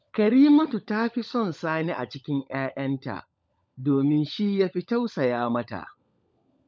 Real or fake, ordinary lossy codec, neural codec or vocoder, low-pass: fake; none; codec, 16 kHz, 16 kbps, FunCodec, trained on LibriTTS, 50 frames a second; none